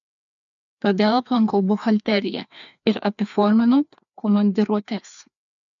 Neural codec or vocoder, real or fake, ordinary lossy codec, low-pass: codec, 16 kHz, 2 kbps, FreqCodec, larger model; fake; MP3, 96 kbps; 7.2 kHz